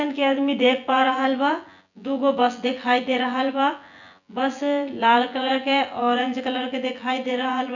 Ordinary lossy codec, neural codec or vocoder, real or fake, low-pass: none; vocoder, 24 kHz, 100 mel bands, Vocos; fake; 7.2 kHz